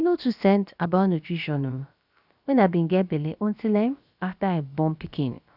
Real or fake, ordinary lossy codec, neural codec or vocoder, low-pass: fake; none; codec, 16 kHz, about 1 kbps, DyCAST, with the encoder's durations; 5.4 kHz